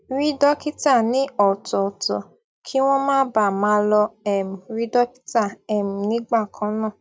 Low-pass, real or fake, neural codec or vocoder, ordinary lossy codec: none; real; none; none